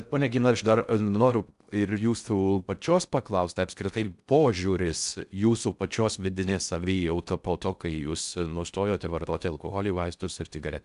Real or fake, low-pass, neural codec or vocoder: fake; 10.8 kHz; codec, 16 kHz in and 24 kHz out, 0.6 kbps, FocalCodec, streaming, 4096 codes